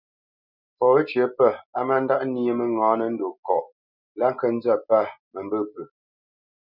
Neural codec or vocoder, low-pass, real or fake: none; 5.4 kHz; real